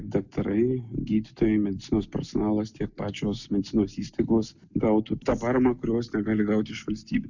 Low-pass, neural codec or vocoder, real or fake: 7.2 kHz; none; real